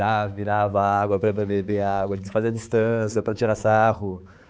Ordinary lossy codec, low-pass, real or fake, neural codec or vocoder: none; none; fake; codec, 16 kHz, 4 kbps, X-Codec, HuBERT features, trained on balanced general audio